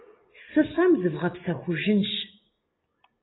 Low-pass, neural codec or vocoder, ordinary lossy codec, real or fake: 7.2 kHz; none; AAC, 16 kbps; real